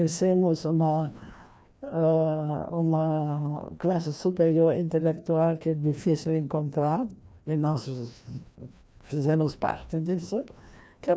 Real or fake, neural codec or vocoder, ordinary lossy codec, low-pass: fake; codec, 16 kHz, 1 kbps, FreqCodec, larger model; none; none